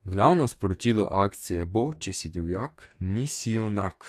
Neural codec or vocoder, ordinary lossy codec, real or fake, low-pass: codec, 44.1 kHz, 2.6 kbps, DAC; AAC, 96 kbps; fake; 14.4 kHz